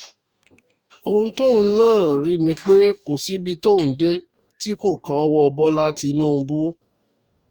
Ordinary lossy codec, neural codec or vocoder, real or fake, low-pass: Opus, 64 kbps; codec, 44.1 kHz, 2.6 kbps, DAC; fake; 19.8 kHz